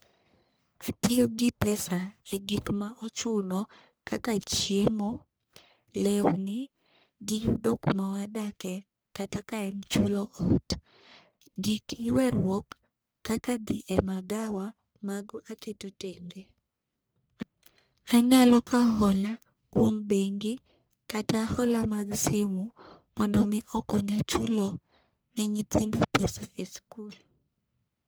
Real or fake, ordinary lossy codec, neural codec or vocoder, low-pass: fake; none; codec, 44.1 kHz, 1.7 kbps, Pupu-Codec; none